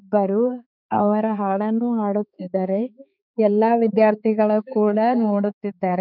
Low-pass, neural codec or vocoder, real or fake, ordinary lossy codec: 5.4 kHz; codec, 16 kHz, 4 kbps, X-Codec, HuBERT features, trained on balanced general audio; fake; none